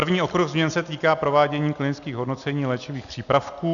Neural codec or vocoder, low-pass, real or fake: none; 7.2 kHz; real